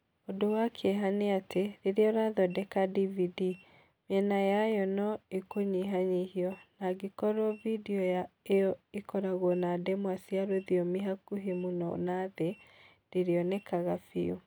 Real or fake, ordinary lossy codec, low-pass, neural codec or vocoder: real; none; none; none